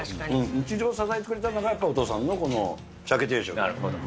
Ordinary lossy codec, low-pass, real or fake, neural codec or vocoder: none; none; real; none